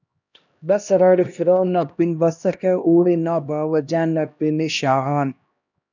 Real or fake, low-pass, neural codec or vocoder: fake; 7.2 kHz; codec, 16 kHz, 1 kbps, X-Codec, HuBERT features, trained on LibriSpeech